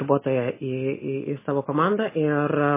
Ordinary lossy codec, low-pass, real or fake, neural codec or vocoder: MP3, 16 kbps; 3.6 kHz; real; none